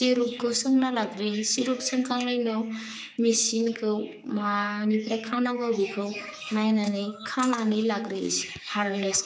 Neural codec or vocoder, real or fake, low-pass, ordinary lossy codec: codec, 16 kHz, 4 kbps, X-Codec, HuBERT features, trained on general audio; fake; none; none